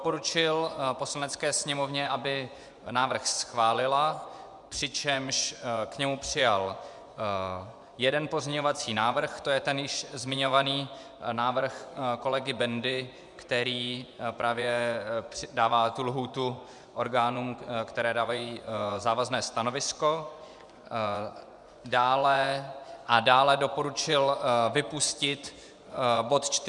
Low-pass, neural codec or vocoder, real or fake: 10.8 kHz; vocoder, 24 kHz, 100 mel bands, Vocos; fake